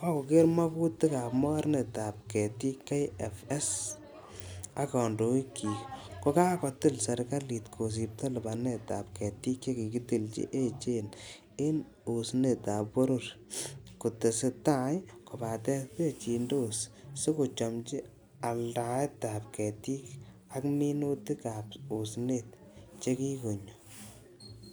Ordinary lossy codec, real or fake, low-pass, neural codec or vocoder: none; real; none; none